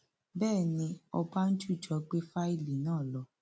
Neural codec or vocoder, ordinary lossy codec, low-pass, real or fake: none; none; none; real